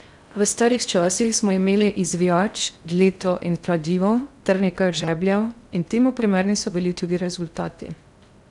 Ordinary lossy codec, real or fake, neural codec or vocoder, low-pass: none; fake; codec, 16 kHz in and 24 kHz out, 0.6 kbps, FocalCodec, streaming, 4096 codes; 10.8 kHz